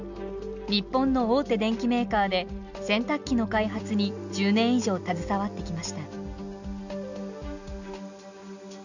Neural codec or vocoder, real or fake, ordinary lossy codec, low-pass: none; real; none; 7.2 kHz